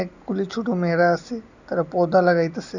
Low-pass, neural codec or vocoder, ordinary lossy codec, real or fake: 7.2 kHz; none; none; real